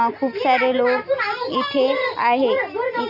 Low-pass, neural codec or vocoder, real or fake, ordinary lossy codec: 5.4 kHz; none; real; none